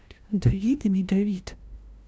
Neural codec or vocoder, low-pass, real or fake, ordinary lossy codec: codec, 16 kHz, 0.5 kbps, FunCodec, trained on LibriTTS, 25 frames a second; none; fake; none